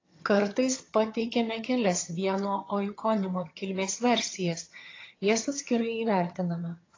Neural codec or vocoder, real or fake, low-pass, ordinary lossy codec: vocoder, 22.05 kHz, 80 mel bands, HiFi-GAN; fake; 7.2 kHz; AAC, 32 kbps